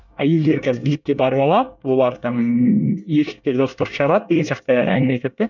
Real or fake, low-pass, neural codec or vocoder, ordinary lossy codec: fake; 7.2 kHz; codec, 24 kHz, 1 kbps, SNAC; none